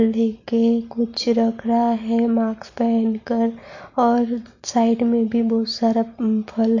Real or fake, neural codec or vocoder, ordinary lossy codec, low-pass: real; none; AAC, 48 kbps; 7.2 kHz